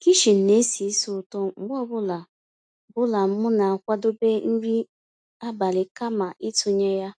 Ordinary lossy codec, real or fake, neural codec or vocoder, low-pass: AAC, 64 kbps; real; none; 9.9 kHz